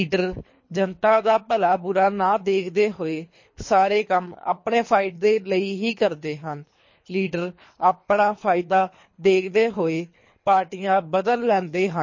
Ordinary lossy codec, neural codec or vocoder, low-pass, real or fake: MP3, 32 kbps; codec, 24 kHz, 3 kbps, HILCodec; 7.2 kHz; fake